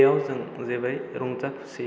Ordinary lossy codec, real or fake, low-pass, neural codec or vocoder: none; real; none; none